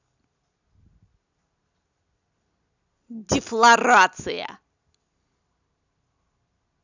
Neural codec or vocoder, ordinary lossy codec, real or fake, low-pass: none; none; real; 7.2 kHz